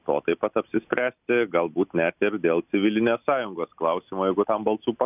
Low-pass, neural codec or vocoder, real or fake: 3.6 kHz; none; real